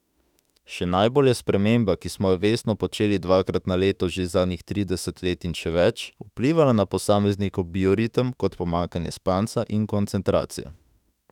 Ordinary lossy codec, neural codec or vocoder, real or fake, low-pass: none; autoencoder, 48 kHz, 32 numbers a frame, DAC-VAE, trained on Japanese speech; fake; 19.8 kHz